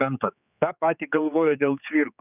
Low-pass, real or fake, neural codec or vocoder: 3.6 kHz; fake; codec, 16 kHz, 4 kbps, X-Codec, HuBERT features, trained on general audio